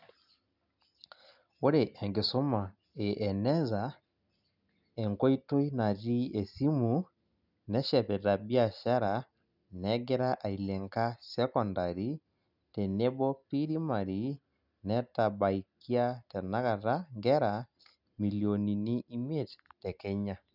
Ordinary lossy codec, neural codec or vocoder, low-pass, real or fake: none; none; 5.4 kHz; real